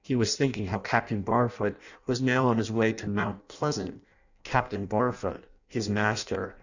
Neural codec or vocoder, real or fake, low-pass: codec, 16 kHz in and 24 kHz out, 0.6 kbps, FireRedTTS-2 codec; fake; 7.2 kHz